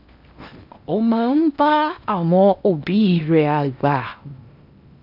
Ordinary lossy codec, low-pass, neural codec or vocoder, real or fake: none; 5.4 kHz; codec, 16 kHz in and 24 kHz out, 0.8 kbps, FocalCodec, streaming, 65536 codes; fake